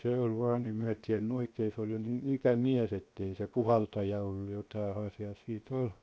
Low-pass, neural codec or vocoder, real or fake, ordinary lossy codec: none; codec, 16 kHz, 0.7 kbps, FocalCodec; fake; none